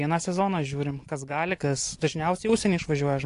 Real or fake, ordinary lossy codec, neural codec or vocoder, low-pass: real; AAC, 48 kbps; none; 10.8 kHz